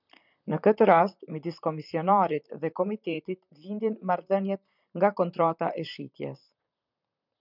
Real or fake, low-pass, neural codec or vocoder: fake; 5.4 kHz; vocoder, 44.1 kHz, 128 mel bands, Pupu-Vocoder